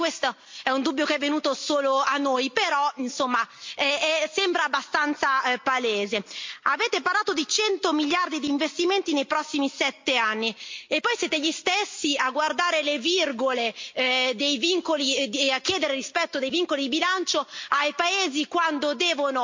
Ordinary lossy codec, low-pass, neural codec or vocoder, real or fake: MP3, 48 kbps; 7.2 kHz; none; real